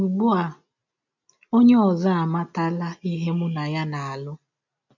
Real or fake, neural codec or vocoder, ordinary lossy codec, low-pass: real; none; none; 7.2 kHz